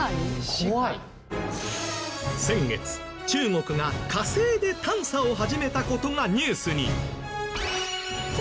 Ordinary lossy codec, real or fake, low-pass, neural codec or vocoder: none; real; none; none